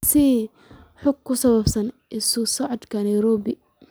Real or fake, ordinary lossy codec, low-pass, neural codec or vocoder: real; none; none; none